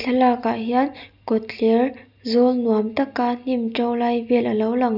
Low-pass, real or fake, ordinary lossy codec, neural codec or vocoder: 5.4 kHz; real; none; none